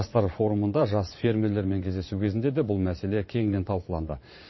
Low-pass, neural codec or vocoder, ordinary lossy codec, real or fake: 7.2 kHz; vocoder, 22.05 kHz, 80 mel bands, Vocos; MP3, 24 kbps; fake